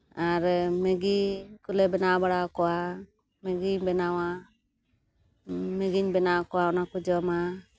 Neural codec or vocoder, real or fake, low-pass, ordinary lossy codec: none; real; none; none